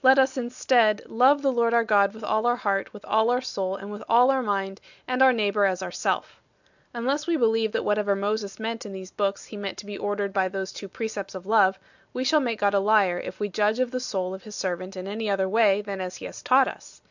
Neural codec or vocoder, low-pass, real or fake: none; 7.2 kHz; real